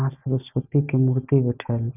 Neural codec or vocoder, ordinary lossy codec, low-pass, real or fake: none; none; 3.6 kHz; real